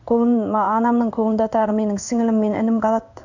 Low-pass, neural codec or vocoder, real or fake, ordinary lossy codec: 7.2 kHz; codec, 16 kHz in and 24 kHz out, 1 kbps, XY-Tokenizer; fake; none